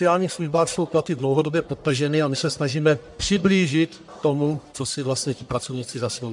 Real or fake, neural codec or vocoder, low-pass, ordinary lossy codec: fake; codec, 44.1 kHz, 1.7 kbps, Pupu-Codec; 10.8 kHz; MP3, 64 kbps